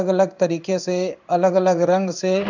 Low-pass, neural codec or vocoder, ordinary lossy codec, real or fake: 7.2 kHz; codec, 16 kHz in and 24 kHz out, 1 kbps, XY-Tokenizer; none; fake